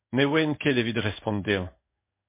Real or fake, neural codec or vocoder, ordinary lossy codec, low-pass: fake; codec, 16 kHz in and 24 kHz out, 1 kbps, XY-Tokenizer; MP3, 24 kbps; 3.6 kHz